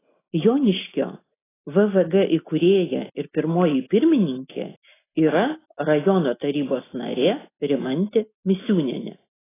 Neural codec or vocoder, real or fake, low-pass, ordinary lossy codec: none; real; 3.6 kHz; AAC, 16 kbps